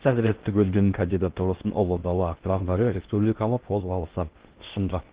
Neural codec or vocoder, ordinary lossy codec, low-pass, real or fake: codec, 16 kHz in and 24 kHz out, 0.6 kbps, FocalCodec, streaming, 4096 codes; Opus, 16 kbps; 3.6 kHz; fake